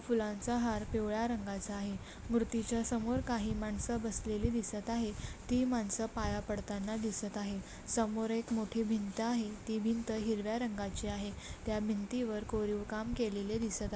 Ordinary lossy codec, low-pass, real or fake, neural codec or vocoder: none; none; real; none